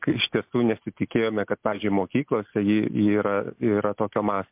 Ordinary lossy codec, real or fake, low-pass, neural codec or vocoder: MP3, 32 kbps; real; 3.6 kHz; none